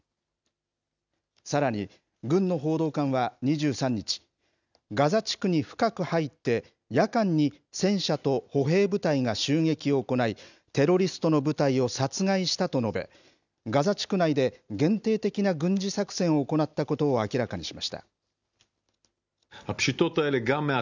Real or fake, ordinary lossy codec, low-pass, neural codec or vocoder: real; none; 7.2 kHz; none